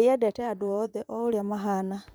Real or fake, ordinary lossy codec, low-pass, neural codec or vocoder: fake; none; none; vocoder, 44.1 kHz, 128 mel bands, Pupu-Vocoder